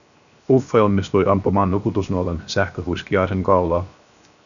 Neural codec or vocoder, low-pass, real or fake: codec, 16 kHz, 0.7 kbps, FocalCodec; 7.2 kHz; fake